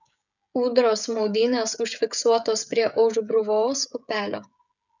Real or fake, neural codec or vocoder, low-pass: fake; codec, 16 kHz, 16 kbps, FreqCodec, smaller model; 7.2 kHz